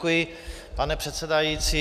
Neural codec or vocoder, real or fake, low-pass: none; real; 14.4 kHz